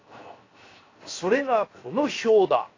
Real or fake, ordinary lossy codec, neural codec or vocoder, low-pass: fake; AAC, 32 kbps; codec, 16 kHz, 0.7 kbps, FocalCodec; 7.2 kHz